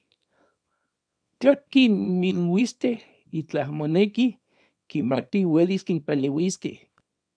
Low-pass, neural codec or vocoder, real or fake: 9.9 kHz; codec, 24 kHz, 0.9 kbps, WavTokenizer, small release; fake